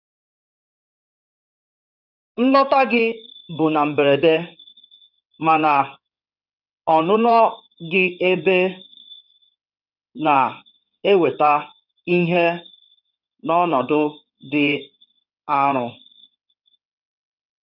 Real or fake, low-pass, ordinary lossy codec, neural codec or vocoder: fake; 5.4 kHz; none; codec, 16 kHz in and 24 kHz out, 2.2 kbps, FireRedTTS-2 codec